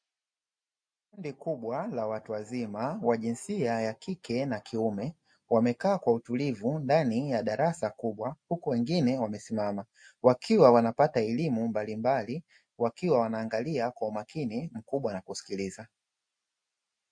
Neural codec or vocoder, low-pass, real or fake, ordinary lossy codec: none; 9.9 kHz; real; MP3, 48 kbps